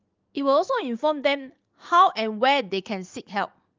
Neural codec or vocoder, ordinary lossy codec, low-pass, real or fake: vocoder, 44.1 kHz, 80 mel bands, Vocos; Opus, 24 kbps; 7.2 kHz; fake